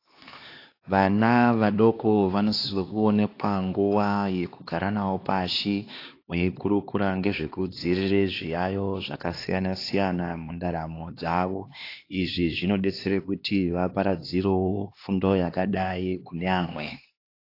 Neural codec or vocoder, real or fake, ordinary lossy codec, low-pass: codec, 16 kHz, 2 kbps, X-Codec, HuBERT features, trained on LibriSpeech; fake; AAC, 32 kbps; 5.4 kHz